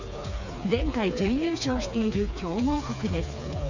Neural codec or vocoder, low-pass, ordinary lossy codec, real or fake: codec, 16 kHz, 4 kbps, FreqCodec, smaller model; 7.2 kHz; none; fake